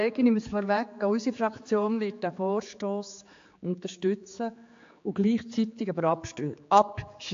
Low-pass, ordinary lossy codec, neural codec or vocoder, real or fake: 7.2 kHz; AAC, 48 kbps; codec, 16 kHz, 4 kbps, X-Codec, HuBERT features, trained on general audio; fake